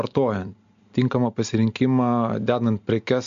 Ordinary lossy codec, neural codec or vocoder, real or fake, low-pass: MP3, 64 kbps; none; real; 7.2 kHz